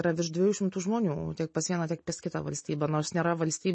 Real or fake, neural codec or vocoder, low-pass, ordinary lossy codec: real; none; 9.9 kHz; MP3, 32 kbps